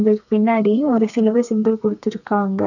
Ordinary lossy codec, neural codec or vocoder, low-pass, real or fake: none; codec, 32 kHz, 1.9 kbps, SNAC; 7.2 kHz; fake